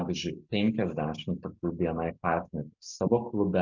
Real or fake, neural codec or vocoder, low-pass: real; none; 7.2 kHz